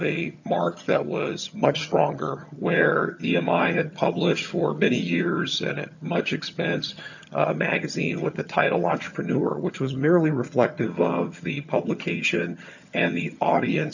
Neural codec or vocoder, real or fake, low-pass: vocoder, 22.05 kHz, 80 mel bands, HiFi-GAN; fake; 7.2 kHz